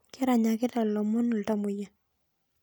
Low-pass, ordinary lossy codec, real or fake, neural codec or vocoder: none; none; real; none